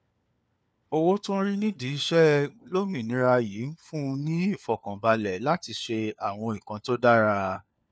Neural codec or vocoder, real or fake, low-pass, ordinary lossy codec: codec, 16 kHz, 4 kbps, FunCodec, trained on LibriTTS, 50 frames a second; fake; none; none